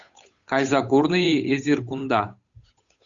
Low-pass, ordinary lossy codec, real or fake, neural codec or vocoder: 7.2 kHz; Opus, 64 kbps; fake; codec, 16 kHz, 8 kbps, FunCodec, trained on Chinese and English, 25 frames a second